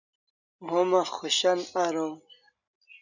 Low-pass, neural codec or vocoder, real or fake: 7.2 kHz; none; real